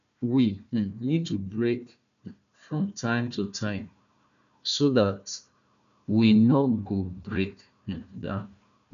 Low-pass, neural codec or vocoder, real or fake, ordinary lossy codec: 7.2 kHz; codec, 16 kHz, 1 kbps, FunCodec, trained on Chinese and English, 50 frames a second; fake; none